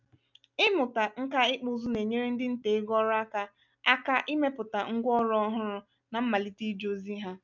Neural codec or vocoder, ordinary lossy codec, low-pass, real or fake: none; none; 7.2 kHz; real